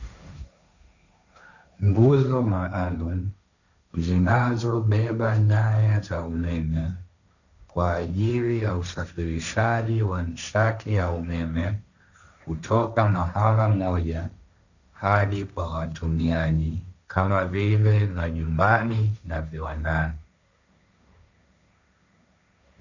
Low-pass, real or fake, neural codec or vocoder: 7.2 kHz; fake; codec, 16 kHz, 1.1 kbps, Voila-Tokenizer